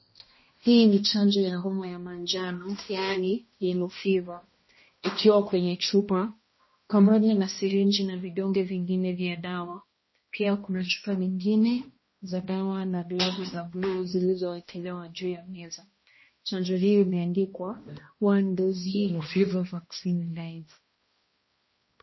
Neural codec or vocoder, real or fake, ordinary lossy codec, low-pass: codec, 16 kHz, 1 kbps, X-Codec, HuBERT features, trained on balanced general audio; fake; MP3, 24 kbps; 7.2 kHz